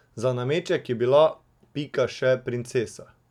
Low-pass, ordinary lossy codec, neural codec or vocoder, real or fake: 19.8 kHz; none; none; real